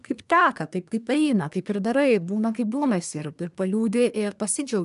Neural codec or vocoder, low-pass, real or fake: codec, 24 kHz, 1 kbps, SNAC; 10.8 kHz; fake